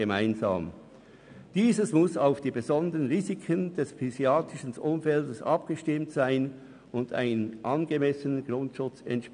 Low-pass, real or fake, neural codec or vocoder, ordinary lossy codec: 9.9 kHz; real; none; none